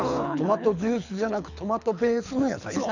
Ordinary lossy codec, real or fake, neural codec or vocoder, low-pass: none; fake; codec, 24 kHz, 6 kbps, HILCodec; 7.2 kHz